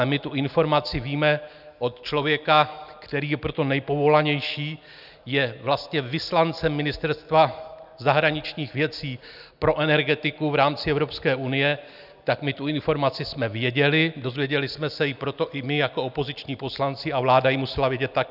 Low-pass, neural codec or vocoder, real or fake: 5.4 kHz; none; real